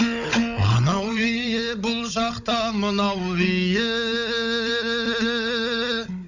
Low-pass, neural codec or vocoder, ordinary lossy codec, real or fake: 7.2 kHz; codec, 16 kHz, 16 kbps, FunCodec, trained on Chinese and English, 50 frames a second; none; fake